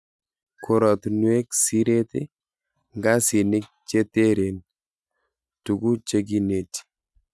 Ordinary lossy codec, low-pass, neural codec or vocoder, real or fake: none; none; none; real